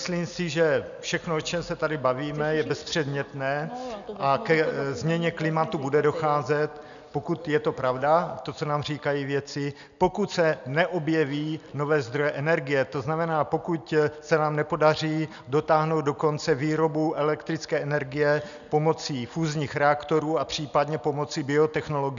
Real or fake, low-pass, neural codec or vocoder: real; 7.2 kHz; none